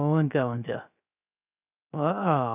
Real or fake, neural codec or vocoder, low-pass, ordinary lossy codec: fake; codec, 16 kHz, 0.3 kbps, FocalCodec; 3.6 kHz; none